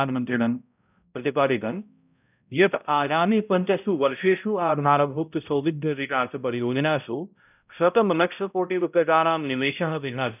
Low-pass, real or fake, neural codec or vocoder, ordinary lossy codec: 3.6 kHz; fake; codec, 16 kHz, 0.5 kbps, X-Codec, HuBERT features, trained on balanced general audio; none